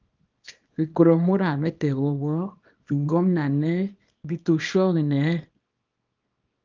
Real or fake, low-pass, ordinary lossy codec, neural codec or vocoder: fake; 7.2 kHz; Opus, 24 kbps; codec, 24 kHz, 0.9 kbps, WavTokenizer, small release